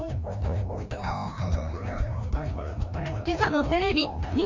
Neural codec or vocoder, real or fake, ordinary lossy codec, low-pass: codec, 16 kHz, 1 kbps, FreqCodec, larger model; fake; MP3, 48 kbps; 7.2 kHz